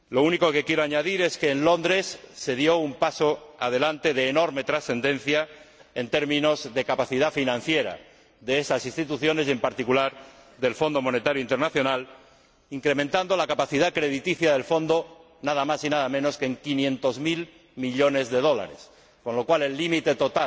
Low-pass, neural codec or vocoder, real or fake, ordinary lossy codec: none; none; real; none